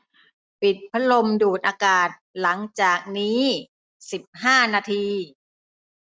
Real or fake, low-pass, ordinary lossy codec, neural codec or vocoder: real; none; none; none